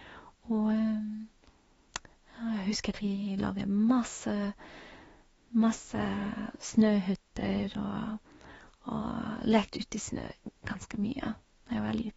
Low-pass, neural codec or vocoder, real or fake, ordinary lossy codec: 19.8 kHz; autoencoder, 48 kHz, 32 numbers a frame, DAC-VAE, trained on Japanese speech; fake; AAC, 24 kbps